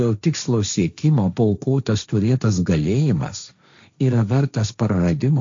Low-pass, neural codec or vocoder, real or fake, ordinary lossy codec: 7.2 kHz; codec, 16 kHz, 1.1 kbps, Voila-Tokenizer; fake; AAC, 64 kbps